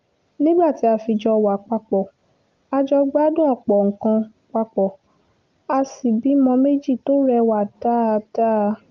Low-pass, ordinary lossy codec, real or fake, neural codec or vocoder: 7.2 kHz; Opus, 32 kbps; real; none